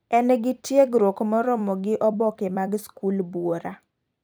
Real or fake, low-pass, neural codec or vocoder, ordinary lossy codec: fake; none; vocoder, 44.1 kHz, 128 mel bands every 256 samples, BigVGAN v2; none